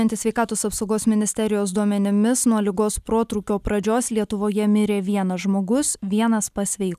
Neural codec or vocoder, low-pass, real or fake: none; 14.4 kHz; real